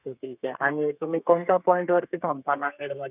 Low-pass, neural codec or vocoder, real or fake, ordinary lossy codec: 3.6 kHz; codec, 32 kHz, 1.9 kbps, SNAC; fake; none